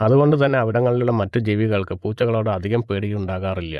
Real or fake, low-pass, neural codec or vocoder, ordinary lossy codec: fake; none; vocoder, 24 kHz, 100 mel bands, Vocos; none